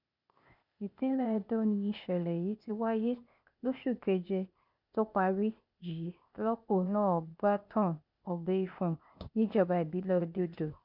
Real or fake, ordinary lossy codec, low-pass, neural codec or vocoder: fake; none; 5.4 kHz; codec, 16 kHz, 0.8 kbps, ZipCodec